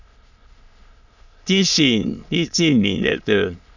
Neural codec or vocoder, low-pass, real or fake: autoencoder, 22.05 kHz, a latent of 192 numbers a frame, VITS, trained on many speakers; 7.2 kHz; fake